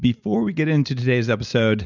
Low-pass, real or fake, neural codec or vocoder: 7.2 kHz; real; none